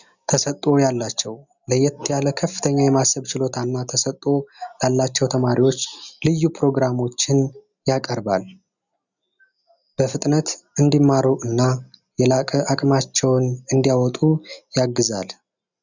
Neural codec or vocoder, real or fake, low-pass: none; real; 7.2 kHz